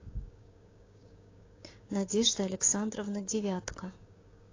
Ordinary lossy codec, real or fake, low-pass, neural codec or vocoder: AAC, 32 kbps; fake; 7.2 kHz; codec, 16 kHz, 6 kbps, DAC